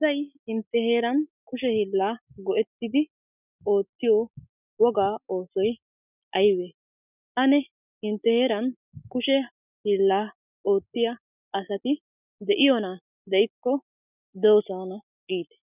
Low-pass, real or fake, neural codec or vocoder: 3.6 kHz; real; none